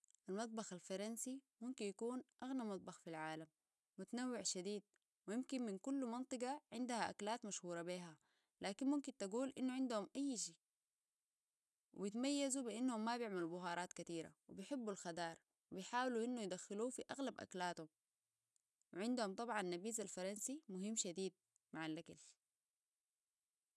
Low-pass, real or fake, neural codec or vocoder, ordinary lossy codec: none; real; none; none